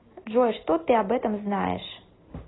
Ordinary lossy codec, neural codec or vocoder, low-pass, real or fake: AAC, 16 kbps; none; 7.2 kHz; real